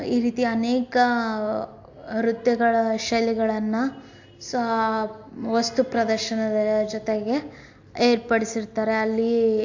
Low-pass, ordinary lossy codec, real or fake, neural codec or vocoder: 7.2 kHz; AAC, 48 kbps; real; none